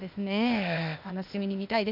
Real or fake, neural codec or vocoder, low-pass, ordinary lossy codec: fake; codec, 16 kHz, 0.8 kbps, ZipCodec; 5.4 kHz; MP3, 48 kbps